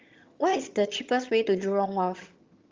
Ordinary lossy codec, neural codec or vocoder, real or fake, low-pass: Opus, 32 kbps; vocoder, 22.05 kHz, 80 mel bands, HiFi-GAN; fake; 7.2 kHz